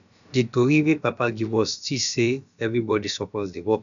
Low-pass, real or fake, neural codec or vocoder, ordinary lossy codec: 7.2 kHz; fake; codec, 16 kHz, about 1 kbps, DyCAST, with the encoder's durations; none